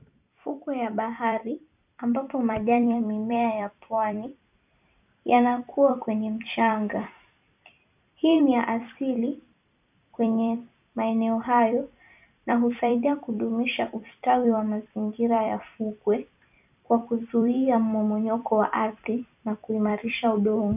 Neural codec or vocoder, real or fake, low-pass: vocoder, 44.1 kHz, 128 mel bands every 256 samples, BigVGAN v2; fake; 3.6 kHz